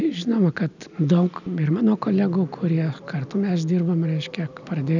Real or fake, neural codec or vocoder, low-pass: real; none; 7.2 kHz